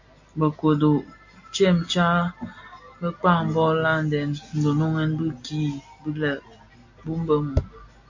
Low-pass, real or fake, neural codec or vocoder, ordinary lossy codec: 7.2 kHz; real; none; AAC, 48 kbps